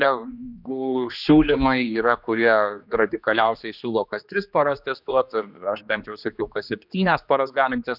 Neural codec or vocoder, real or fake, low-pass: codec, 16 kHz, 2 kbps, X-Codec, HuBERT features, trained on general audio; fake; 5.4 kHz